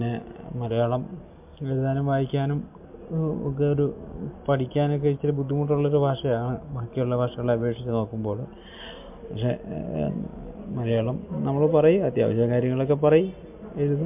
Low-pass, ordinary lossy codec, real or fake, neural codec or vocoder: 3.6 kHz; MP3, 32 kbps; real; none